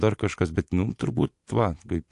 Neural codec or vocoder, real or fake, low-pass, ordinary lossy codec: vocoder, 24 kHz, 100 mel bands, Vocos; fake; 10.8 kHz; AAC, 64 kbps